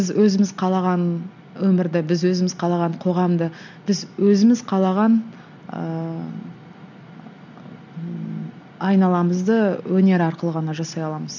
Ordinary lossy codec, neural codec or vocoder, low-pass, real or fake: none; none; 7.2 kHz; real